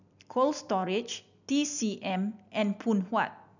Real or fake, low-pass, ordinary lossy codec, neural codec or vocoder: real; 7.2 kHz; none; none